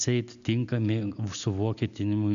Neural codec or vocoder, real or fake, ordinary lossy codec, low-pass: none; real; MP3, 96 kbps; 7.2 kHz